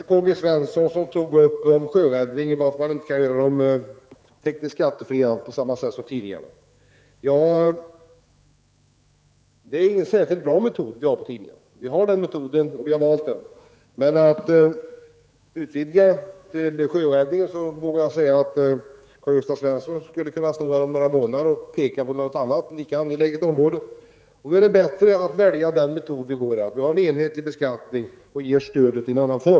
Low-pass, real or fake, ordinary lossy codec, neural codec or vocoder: none; fake; none; codec, 16 kHz, 4 kbps, X-Codec, HuBERT features, trained on general audio